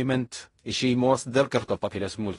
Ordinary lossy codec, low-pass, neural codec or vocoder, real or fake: AAC, 32 kbps; 10.8 kHz; codec, 16 kHz in and 24 kHz out, 0.4 kbps, LongCat-Audio-Codec, fine tuned four codebook decoder; fake